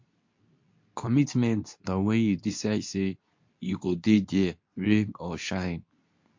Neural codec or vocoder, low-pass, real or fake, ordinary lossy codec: codec, 24 kHz, 0.9 kbps, WavTokenizer, medium speech release version 2; 7.2 kHz; fake; MP3, 48 kbps